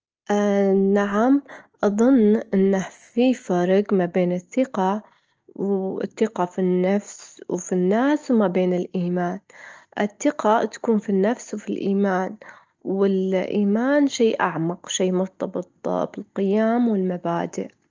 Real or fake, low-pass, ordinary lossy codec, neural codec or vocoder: real; 7.2 kHz; Opus, 24 kbps; none